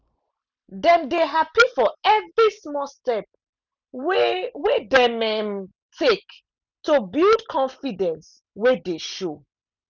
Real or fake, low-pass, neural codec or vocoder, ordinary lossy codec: real; 7.2 kHz; none; none